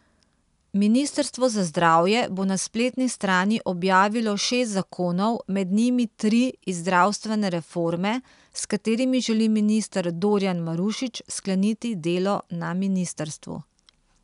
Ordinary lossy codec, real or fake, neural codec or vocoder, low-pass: none; real; none; 10.8 kHz